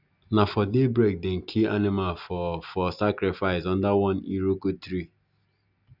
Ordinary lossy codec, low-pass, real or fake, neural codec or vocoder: none; 5.4 kHz; real; none